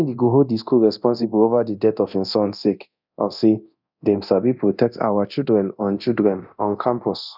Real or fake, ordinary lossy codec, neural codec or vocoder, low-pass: fake; none; codec, 24 kHz, 0.9 kbps, DualCodec; 5.4 kHz